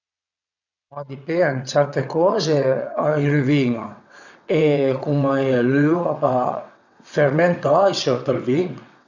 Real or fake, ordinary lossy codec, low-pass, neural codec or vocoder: fake; none; 7.2 kHz; vocoder, 22.05 kHz, 80 mel bands, WaveNeXt